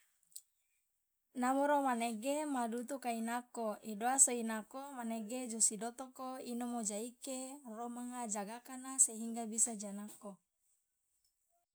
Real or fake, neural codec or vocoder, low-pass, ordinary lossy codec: real; none; none; none